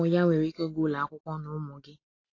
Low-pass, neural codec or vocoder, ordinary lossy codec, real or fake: 7.2 kHz; none; AAC, 32 kbps; real